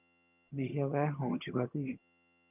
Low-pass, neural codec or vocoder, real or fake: 3.6 kHz; vocoder, 22.05 kHz, 80 mel bands, HiFi-GAN; fake